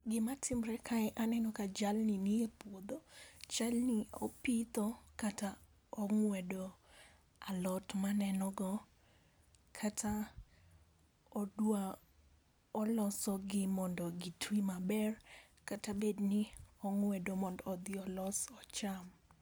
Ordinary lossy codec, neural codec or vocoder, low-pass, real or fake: none; none; none; real